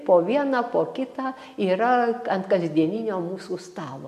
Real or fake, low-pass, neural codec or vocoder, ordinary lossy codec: real; 14.4 kHz; none; MP3, 64 kbps